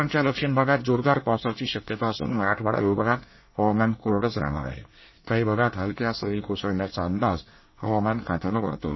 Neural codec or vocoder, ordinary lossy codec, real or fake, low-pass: codec, 24 kHz, 1 kbps, SNAC; MP3, 24 kbps; fake; 7.2 kHz